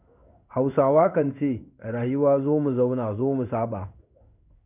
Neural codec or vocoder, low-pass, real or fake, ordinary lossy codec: codec, 16 kHz in and 24 kHz out, 1 kbps, XY-Tokenizer; 3.6 kHz; fake; none